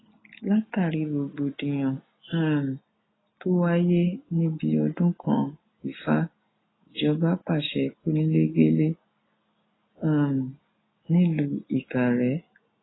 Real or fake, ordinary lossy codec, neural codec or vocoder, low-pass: real; AAC, 16 kbps; none; 7.2 kHz